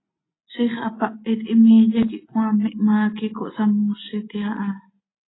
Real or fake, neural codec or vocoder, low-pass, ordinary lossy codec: real; none; 7.2 kHz; AAC, 16 kbps